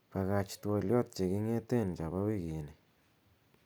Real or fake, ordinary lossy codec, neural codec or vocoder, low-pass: real; none; none; none